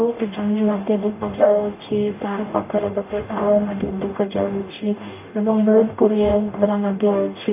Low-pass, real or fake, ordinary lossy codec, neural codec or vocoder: 3.6 kHz; fake; none; codec, 44.1 kHz, 0.9 kbps, DAC